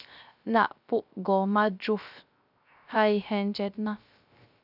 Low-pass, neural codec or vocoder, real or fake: 5.4 kHz; codec, 16 kHz, 0.3 kbps, FocalCodec; fake